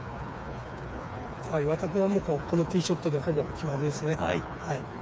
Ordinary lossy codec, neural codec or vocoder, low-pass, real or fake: none; codec, 16 kHz, 4 kbps, FreqCodec, smaller model; none; fake